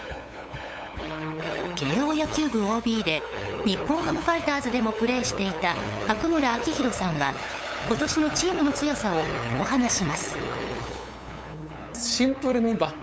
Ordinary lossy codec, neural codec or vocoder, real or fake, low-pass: none; codec, 16 kHz, 8 kbps, FunCodec, trained on LibriTTS, 25 frames a second; fake; none